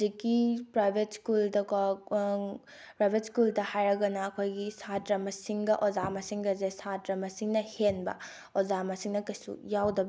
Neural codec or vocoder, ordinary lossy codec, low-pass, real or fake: none; none; none; real